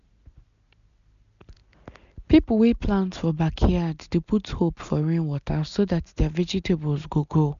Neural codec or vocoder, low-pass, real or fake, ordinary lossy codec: none; 7.2 kHz; real; none